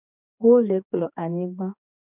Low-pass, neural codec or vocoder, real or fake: 3.6 kHz; codec, 16 kHz, 4 kbps, X-Codec, HuBERT features, trained on general audio; fake